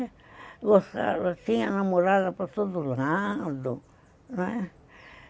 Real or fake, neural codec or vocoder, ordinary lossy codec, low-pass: real; none; none; none